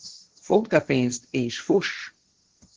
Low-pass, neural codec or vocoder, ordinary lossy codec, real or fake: 7.2 kHz; codec, 16 kHz, 1.1 kbps, Voila-Tokenizer; Opus, 16 kbps; fake